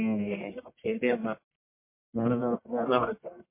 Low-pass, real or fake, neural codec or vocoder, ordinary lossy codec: 3.6 kHz; fake; codec, 44.1 kHz, 1.7 kbps, Pupu-Codec; MP3, 24 kbps